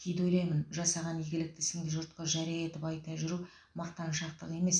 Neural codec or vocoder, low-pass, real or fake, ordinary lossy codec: none; 9.9 kHz; real; none